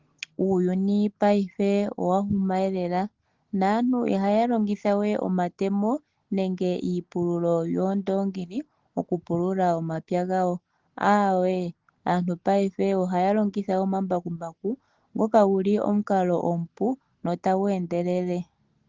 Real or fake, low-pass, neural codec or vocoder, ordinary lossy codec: real; 7.2 kHz; none; Opus, 16 kbps